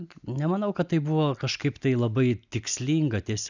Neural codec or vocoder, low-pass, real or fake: none; 7.2 kHz; real